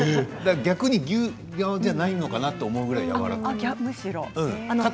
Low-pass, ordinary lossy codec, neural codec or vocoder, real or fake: none; none; none; real